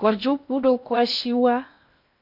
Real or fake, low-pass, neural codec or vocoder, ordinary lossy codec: fake; 5.4 kHz; codec, 16 kHz in and 24 kHz out, 0.6 kbps, FocalCodec, streaming, 4096 codes; AAC, 48 kbps